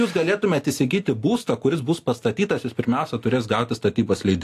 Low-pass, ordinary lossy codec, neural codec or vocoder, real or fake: 14.4 kHz; AAC, 64 kbps; none; real